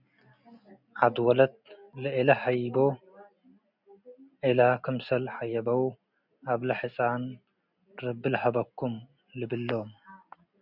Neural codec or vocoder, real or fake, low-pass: none; real; 5.4 kHz